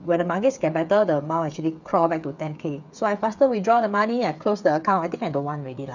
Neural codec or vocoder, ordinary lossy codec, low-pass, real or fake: codec, 16 kHz, 8 kbps, FreqCodec, smaller model; none; 7.2 kHz; fake